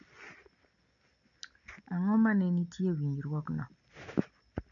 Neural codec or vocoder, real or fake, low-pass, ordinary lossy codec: none; real; 7.2 kHz; none